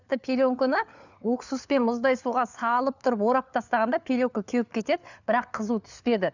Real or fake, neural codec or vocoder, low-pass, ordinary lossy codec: fake; codec, 16 kHz, 4 kbps, FunCodec, trained on LibriTTS, 50 frames a second; 7.2 kHz; none